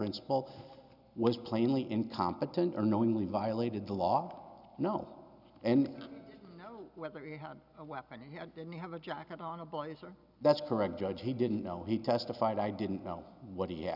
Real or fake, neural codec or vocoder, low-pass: real; none; 5.4 kHz